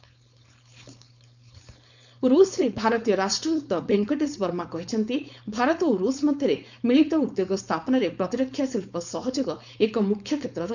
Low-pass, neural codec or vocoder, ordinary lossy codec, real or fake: 7.2 kHz; codec, 16 kHz, 4.8 kbps, FACodec; none; fake